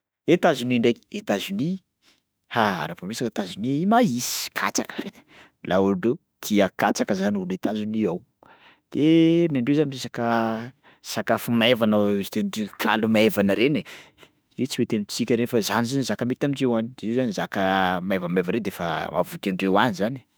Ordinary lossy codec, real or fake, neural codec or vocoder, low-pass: none; fake; autoencoder, 48 kHz, 32 numbers a frame, DAC-VAE, trained on Japanese speech; none